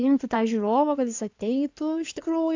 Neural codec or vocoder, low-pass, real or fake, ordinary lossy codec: codec, 16 kHz in and 24 kHz out, 2.2 kbps, FireRedTTS-2 codec; 7.2 kHz; fake; AAC, 48 kbps